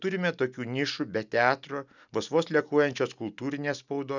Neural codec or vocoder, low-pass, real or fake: none; 7.2 kHz; real